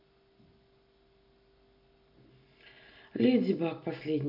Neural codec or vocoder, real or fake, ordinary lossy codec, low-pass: none; real; MP3, 48 kbps; 5.4 kHz